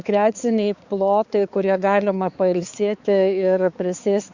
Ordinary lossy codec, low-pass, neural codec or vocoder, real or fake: Opus, 64 kbps; 7.2 kHz; codec, 16 kHz, 4 kbps, X-Codec, HuBERT features, trained on balanced general audio; fake